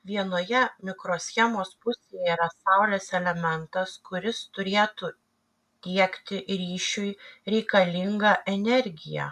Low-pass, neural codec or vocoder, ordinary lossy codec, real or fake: 14.4 kHz; none; MP3, 96 kbps; real